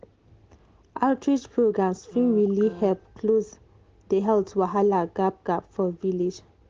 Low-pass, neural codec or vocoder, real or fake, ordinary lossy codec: 7.2 kHz; none; real; Opus, 16 kbps